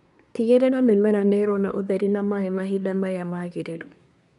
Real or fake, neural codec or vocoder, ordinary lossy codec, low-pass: fake; codec, 24 kHz, 1 kbps, SNAC; none; 10.8 kHz